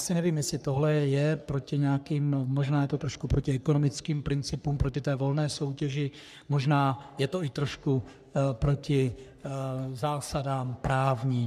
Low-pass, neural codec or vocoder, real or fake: 14.4 kHz; codec, 44.1 kHz, 3.4 kbps, Pupu-Codec; fake